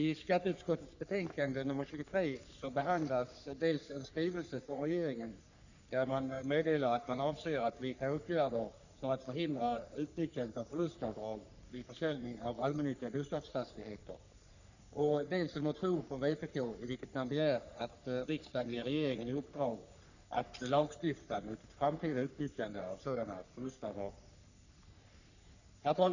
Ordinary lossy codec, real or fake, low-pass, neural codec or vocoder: none; fake; 7.2 kHz; codec, 44.1 kHz, 3.4 kbps, Pupu-Codec